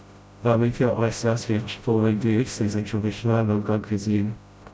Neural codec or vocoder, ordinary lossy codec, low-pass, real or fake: codec, 16 kHz, 0.5 kbps, FreqCodec, smaller model; none; none; fake